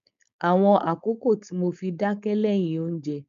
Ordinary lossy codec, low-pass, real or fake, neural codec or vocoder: none; 7.2 kHz; fake; codec, 16 kHz, 4.8 kbps, FACodec